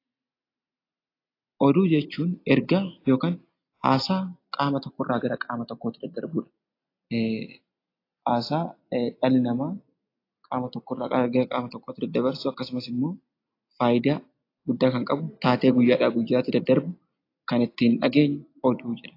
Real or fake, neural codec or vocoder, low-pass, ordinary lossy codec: real; none; 5.4 kHz; AAC, 32 kbps